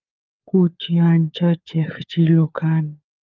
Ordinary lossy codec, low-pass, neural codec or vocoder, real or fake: Opus, 24 kbps; 7.2 kHz; codec, 16 kHz, 4 kbps, FunCodec, trained on LibriTTS, 50 frames a second; fake